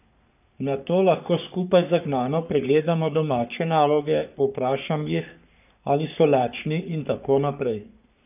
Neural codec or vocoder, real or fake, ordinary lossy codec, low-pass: codec, 44.1 kHz, 3.4 kbps, Pupu-Codec; fake; none; 3.6 kHz